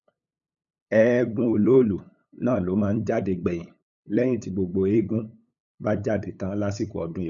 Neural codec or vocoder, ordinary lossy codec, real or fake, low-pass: codec, 16 kHz, 8 kbps, FunCodec, trained on LibriTTS, 25 frames a second; none; fake; 7.2 kHz